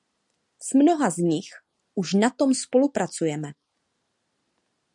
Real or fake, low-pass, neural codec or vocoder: real; 10.8 kHz; none